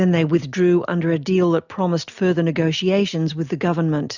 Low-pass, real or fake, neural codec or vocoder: 7.2 kHz; real; none